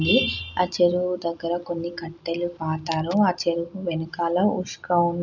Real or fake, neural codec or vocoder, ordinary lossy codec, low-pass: real; none; none; 7.2 kHz